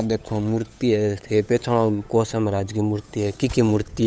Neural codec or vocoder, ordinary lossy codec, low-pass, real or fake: codec, 16 kHz, 8 kbps, FunCodec, trained on Chinese and English, 25 frames a second; none; none; fake